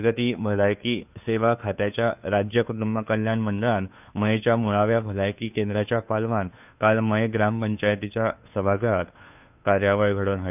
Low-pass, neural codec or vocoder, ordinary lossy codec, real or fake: 3.6 kHz; codec, 16 kHz, 2 kbps, FunCodec, trained on Chinese and English, 25 frames a second; none; fake